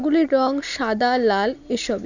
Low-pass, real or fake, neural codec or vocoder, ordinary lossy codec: 7.2 kHz; real; none; none